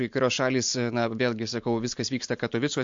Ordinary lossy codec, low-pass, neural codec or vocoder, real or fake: MP3, 48 kbps; 7.2 kHz; none; real